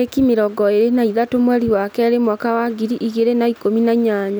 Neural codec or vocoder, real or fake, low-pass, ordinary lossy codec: none; real; none; none